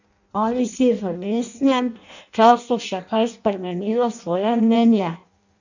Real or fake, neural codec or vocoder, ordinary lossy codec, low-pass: fake; codec, 16 kHz in and 24 kHz out, 0.6 kbps, FireRedTTS-2 codec; none; 7.2 kHz